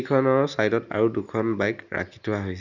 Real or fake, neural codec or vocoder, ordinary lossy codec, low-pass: real; none; none; 7.2 kHz